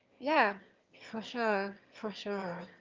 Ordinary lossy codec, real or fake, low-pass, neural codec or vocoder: Opus, 32 kbps; fake; 7.2 kHz; autoencoder, 22.05 kHz, a latent of 192 numbers a frame, VITS, trained on one speaker